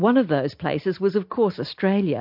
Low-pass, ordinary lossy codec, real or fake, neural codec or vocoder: 5.4 kHz; MP3, 48 kbps; real; none